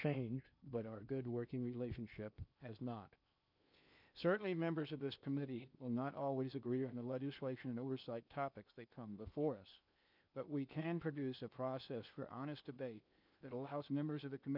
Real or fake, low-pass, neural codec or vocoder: fake; 5.4 kHz; codec, 16 kHz in and 24 kHz out, 0.8 kbps, FocalCodec, streaming, 65536 codes